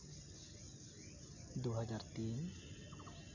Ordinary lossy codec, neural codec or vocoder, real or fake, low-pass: none; none; real; 7.2 kHz